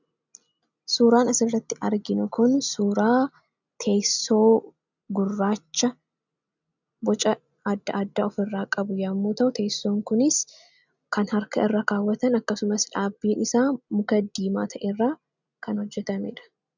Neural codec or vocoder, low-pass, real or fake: none; 7.2 kHz; real